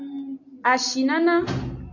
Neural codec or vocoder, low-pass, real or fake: none; 7.2 kHz; real